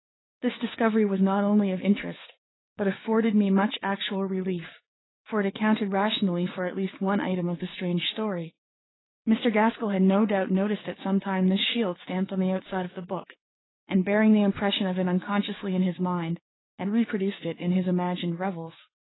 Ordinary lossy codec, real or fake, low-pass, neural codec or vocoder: AAC, 16 kbps; fake; 7.2 kHz; codec, 16 kHz, 6 kbps, DAC